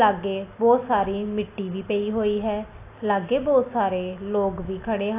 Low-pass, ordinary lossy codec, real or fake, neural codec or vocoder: 3.6 kHz; AAC, 24 kbps; real; none